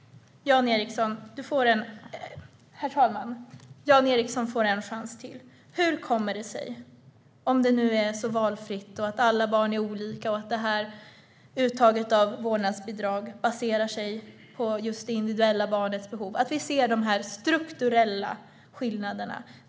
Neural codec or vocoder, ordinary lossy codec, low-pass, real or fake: none; none; none; real